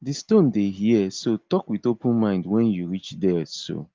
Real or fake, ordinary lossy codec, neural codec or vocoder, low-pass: real; Opus, 32 kbps; none; 7.2 kHz